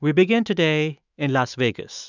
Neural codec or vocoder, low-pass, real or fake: autoencoder, 48 kHz, 128 numbers a frame, DAC-VAE, trained on Japanese speech; 7.2 kHz; fake